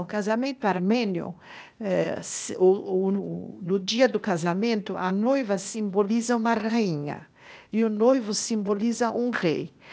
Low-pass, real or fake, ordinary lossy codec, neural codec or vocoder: none; fake; none; codec, 16 kHz, 0.8 kbps, ZipCodec